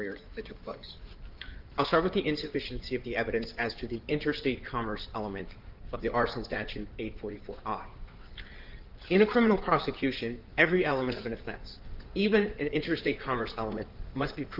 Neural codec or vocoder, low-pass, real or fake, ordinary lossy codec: codec, 16 kHz in and 24 kHz out, 2.2 kbps, FireRedTTS-2 codec; 5.4 kHz; fake; Opus, 32 kbps